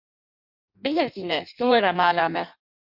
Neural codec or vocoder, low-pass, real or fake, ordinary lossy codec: codec, 16 kHz in and 24 kHz out, 0.6 kbps, FireRedTTS-2 codec; 5.4 kHz; fake; MP3, 48 kbps